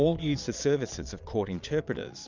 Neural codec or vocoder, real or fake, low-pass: vocoder, 22.05 kHz, 80 mel bands, Vocos; fake; 7.2 kHz